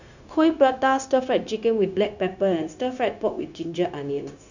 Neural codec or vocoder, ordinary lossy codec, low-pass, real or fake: codec, 16 kHz, 0.9 kbps, LongCat-Audio-Codec; none; 7.2 kHz; fake